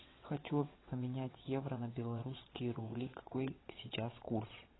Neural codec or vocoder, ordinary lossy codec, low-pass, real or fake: vocoder, 22.05 kHz, 80 mel bands, WaveNeXt; AAC, 16 kbps; 7.2 kHz; fake